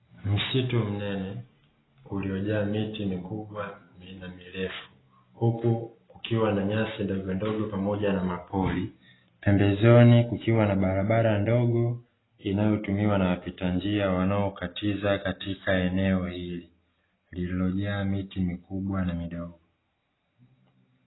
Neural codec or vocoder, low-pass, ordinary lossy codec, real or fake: none; 7.2 kHz; AAC, 16 kbps; real